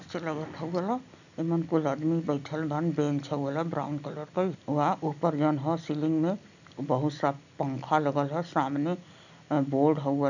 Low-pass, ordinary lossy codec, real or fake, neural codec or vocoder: 7.2 kHz; none; real; none